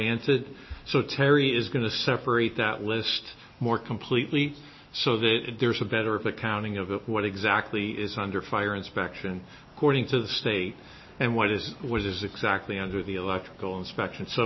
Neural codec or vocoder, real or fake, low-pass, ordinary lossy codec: none; real; 7.2 kHz; MP3, 24 kbps